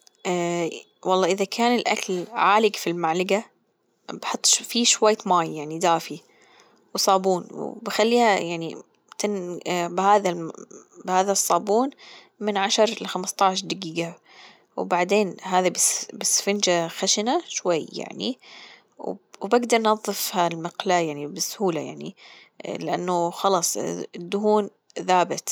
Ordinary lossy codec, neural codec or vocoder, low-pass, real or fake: none; none; none; real